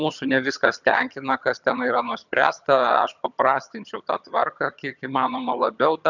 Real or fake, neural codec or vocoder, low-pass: fake; vocoder, 22.05 kHz, 80 mel bands, HiFi-GAN; 7.2 kHz